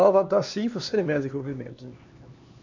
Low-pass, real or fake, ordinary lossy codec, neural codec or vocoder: 7.2 kHz; fake; none; codec, 16 kHz, 2 kbps, X-Codec, HuBERT features, trained on LibriSpeech